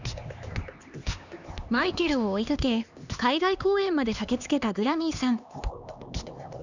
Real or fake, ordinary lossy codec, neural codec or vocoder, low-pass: fake; none; codec, 16 kHz, 2 kbps, X-Codec, HuBERT features, trained on LibriSpeech; 7.2 kHz